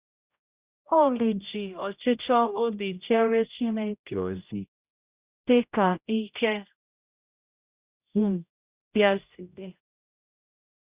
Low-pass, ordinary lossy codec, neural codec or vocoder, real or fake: 3.6 kHz; Opus, 64 kbps; codec, 16 kHz, 0.5 kbps, X-Codec, HuBERT features, trained on general audio; fake